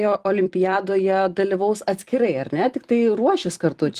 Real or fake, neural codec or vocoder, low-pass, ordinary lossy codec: fake; vocoder, 44.1 kHz, 128 mel bands every 256 samples, BigVGAN v2; 14.4 kHz; Opus, 24 kbps